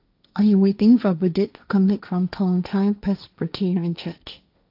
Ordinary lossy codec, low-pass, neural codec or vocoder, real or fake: AAC, 48 kbps; 5.4 kHz; codec, 16 kHz, 1.1 kbps, Voila-Tokenizer; fake